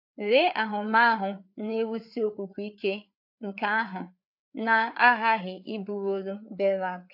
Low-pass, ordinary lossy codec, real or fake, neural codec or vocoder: 5.4 kHz; AAC, 48 kbps; fake; codec, 16 kHz, 4 kbps, FreqCodec, larger model